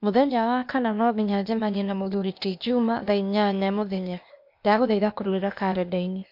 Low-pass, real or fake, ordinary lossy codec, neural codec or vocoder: 5.4 kHz; fake; MP3, 48 kbps; codec, 16 kHz, 0.8 kbps, ZipCodec